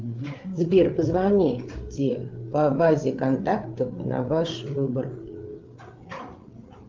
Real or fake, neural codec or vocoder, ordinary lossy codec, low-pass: fake; codec, 16 kHz, 8 kbps, FreqCodec, larger model; Opus, 32 kbps; 7.2 kHz